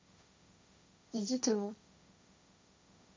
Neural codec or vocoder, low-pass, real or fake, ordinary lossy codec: codec, 16 kHz, 1.1 kbps, Voila-Tokenizer; none; fake; none